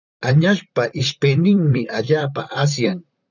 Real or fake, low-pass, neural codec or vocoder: fake; 7.2 kHz; vocoder, 44.1 kHz, 128 mel bands, Pupu-Vocoder